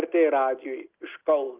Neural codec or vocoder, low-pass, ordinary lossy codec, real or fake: none; 3.6 kHz; Opus, 32 kbps; real